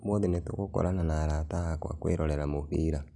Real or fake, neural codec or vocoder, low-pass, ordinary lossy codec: real; none; 10.8 kHz; none